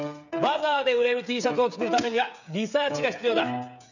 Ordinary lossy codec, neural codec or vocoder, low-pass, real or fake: none; codec, 16 kHz, 8 kbps, FreqCodec, smaller model; 7.2 kHz; fake